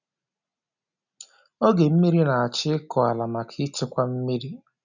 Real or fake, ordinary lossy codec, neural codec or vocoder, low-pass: real; none; none; 7.2 kHz